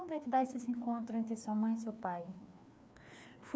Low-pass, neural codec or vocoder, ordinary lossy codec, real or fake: none; codec, 16 kHz, 2 kbps, FreqCodec, larger model; none; fake